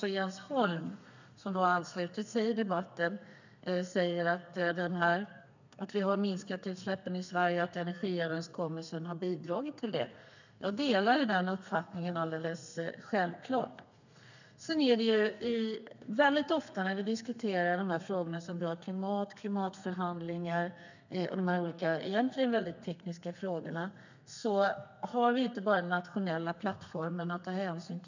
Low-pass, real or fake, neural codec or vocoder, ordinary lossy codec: 7.2 kHz; fake; codec, 32 kHz, 1.9 kbps, SNAC; none